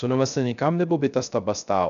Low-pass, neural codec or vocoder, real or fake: 7.2 kHz; codec, 16 kHz, 0.3 kbps, FocalCodec; fake